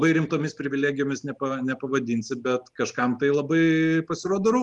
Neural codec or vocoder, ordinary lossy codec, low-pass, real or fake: none; Opus, 24 kbps; 10.8 kHz; real